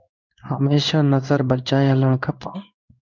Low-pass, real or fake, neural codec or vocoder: 7.2 kHz; fake; codec, 16 kHz in and 24 kHz out, 1 kbps, XY-Tokenizer